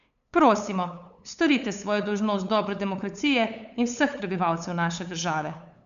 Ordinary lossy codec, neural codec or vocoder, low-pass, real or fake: none; codec, 16 kHz, 8 kbps, FunCodec, trained on LibriTTS, 25 frames a second; 7.2 kHz; fake